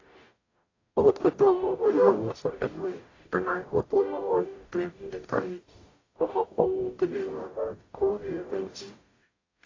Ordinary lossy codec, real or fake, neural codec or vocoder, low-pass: AAC, 32 kbps; fake; codec, 44.1 kHz, 0.9 kbps, DAC; 7.2 kHz